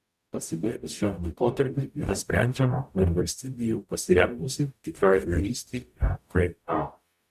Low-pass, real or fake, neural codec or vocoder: 14.4 kHz; fake; codec, 44.1 kHz, 0.9 kbps, DAC